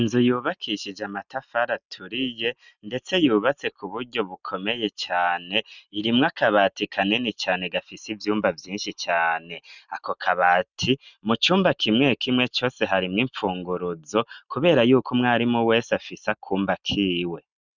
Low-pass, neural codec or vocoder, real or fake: 7.2 kHz; none; real